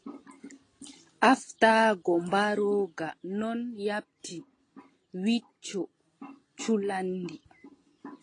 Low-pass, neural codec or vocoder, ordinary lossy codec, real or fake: 9.9 kHz; none; AAC, 32 kbps; real